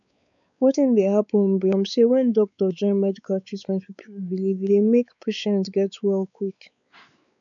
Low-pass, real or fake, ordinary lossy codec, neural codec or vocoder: 7.2 kHz; fake; none; codec, 16 kHz, 4 kbps, X-Codec, WavLM features, trained on Multilingual LibriSpeech